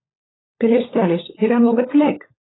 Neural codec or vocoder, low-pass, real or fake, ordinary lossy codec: codec, 16 kHz, 16 kbps, FunCodec, trained on LibriTTS, 50 frames a second; 7.2 kHz; fake; AAC, 16 kbps